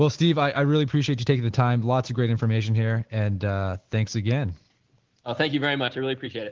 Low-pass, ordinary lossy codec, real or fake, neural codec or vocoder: 7.2 kHz; Opus, 16 kbps; real; none